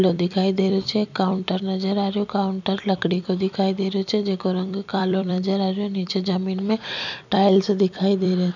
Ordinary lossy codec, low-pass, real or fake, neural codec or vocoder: none; 7.2 kHz; fake; vocoder, 44.1 kHz, 128 mel bands every 256 samples, BigVGAN v2